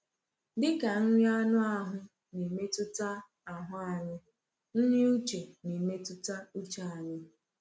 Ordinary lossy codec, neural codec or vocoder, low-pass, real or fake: none; none; none; real